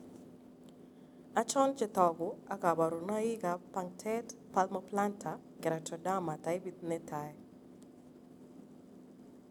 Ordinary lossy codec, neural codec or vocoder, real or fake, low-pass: none; none; real; 19.8 kHz